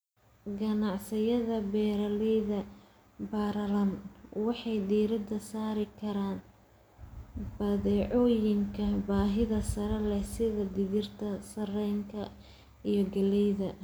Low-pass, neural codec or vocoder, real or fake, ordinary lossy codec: none; none; real; none